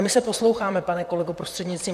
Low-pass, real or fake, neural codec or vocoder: 14.4 kHz; fake; vocoder, 44.1 kHz, 128 mel bands, Pupu-Vocoder